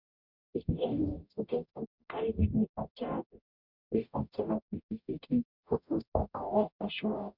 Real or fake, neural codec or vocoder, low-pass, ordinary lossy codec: fake; codec, 44.1 kHz, 0.9 kbps, DAC; 5.4 kHz; none